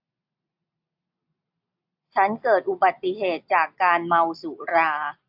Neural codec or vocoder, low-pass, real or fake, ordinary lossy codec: none; 5.4 kHz; real; AAC, 48 kbps